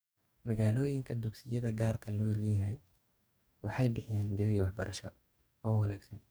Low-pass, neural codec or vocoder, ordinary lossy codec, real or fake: none; codec, 44.1 kHz, 2.6 kbps, DAC; none; fake